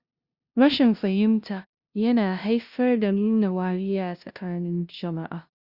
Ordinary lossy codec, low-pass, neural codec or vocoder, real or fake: Opus, 64 kbps; 5.4 kHz; codec, 16 kHz, 0.5 kbps, FunCodec, trained on LibriTTS, 25 frames a second; fake